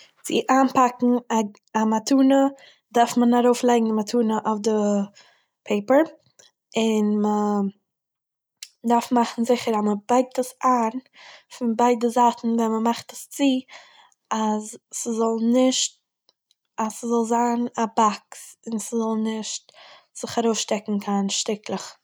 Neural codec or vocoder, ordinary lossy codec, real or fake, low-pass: none; none; real; none